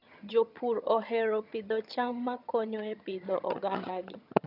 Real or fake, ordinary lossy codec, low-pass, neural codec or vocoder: fake; none; 5.4 kHz; vocoder, 22.05 kHz, 80 mel bands, HiFi-GAN